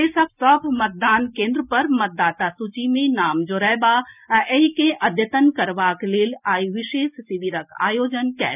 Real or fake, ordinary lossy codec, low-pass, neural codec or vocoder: real; none; 3.6 kHz; none